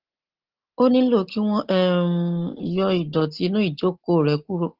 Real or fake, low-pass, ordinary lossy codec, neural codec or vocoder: real; 5.4 kHz; Opus, 16 kbps; none